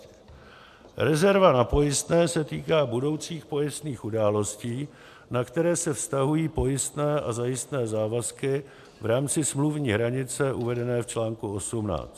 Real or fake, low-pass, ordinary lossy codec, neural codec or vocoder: real; 14.4 kHz; AAC, 96 kbps; none